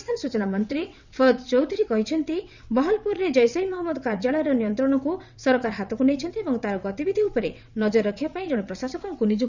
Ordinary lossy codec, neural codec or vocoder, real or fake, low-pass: none; vocoder, 22.05 kHz, 80 mel bands, WaveNeXt; fake; 7.2 kHz